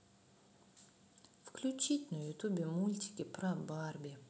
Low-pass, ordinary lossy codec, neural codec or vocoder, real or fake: none; none; none; real